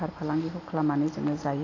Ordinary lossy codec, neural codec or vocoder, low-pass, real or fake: AAC, 32 kbps; none; 7.2 kHz; real